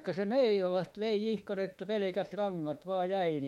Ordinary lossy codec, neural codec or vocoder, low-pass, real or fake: MP3, 64 kbps; autoencoder, 48 kHz, 32 numbers a frame, DAC-VAE, trained on Japanese speech; 19.8 kHz; fake